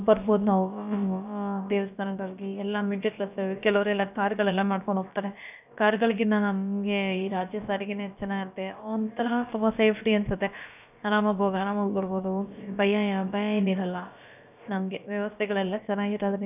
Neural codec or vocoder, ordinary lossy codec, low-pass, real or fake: codec, 16 kHz, about 1 kbps, DyCAST, with the encoder's durations; none; 3.6 kHz; fake